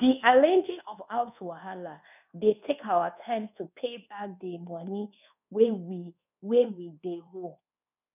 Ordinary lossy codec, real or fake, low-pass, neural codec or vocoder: none; fake; 3.6 kHz; codec, 24 kHz, 1.2 kbps, DualCodec